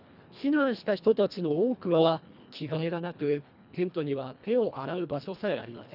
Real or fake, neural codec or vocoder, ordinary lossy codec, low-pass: fake; codec, 24 kHz, 1.5 kbps, HILCodec; none; 5.4 kHz